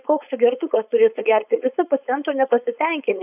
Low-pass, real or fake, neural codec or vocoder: 3.6 kHz; fake; codec, 16 kHz, 4.8 kbps, FACodec